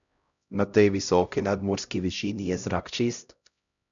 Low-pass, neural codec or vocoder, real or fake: 7.2 kHz; codec, 16 kHz, 0.5 kbps, X-Codec, HuBERT features, trained on LibriSpeech; fake